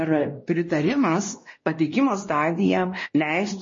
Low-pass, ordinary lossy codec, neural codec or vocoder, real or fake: 7.2 kHz; MP3, 32 kbps; codec, 16 kHz, 1 kbps, X-Codec, WavLM features, trained on Multilingual LibriSpeech; fake